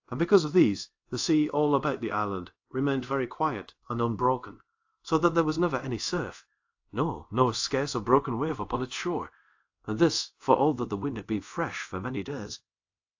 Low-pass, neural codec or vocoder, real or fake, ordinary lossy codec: 7.2 kHz; codec, 24 kHz, 0.5 kbps, DualCodec; fake; AAC, 48 kbps